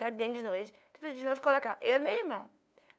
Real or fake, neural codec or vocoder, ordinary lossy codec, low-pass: fake; codec, 16 kHz, 2 kbps, FunCodec, trained on LibriTTS, 25 frames a second; none; none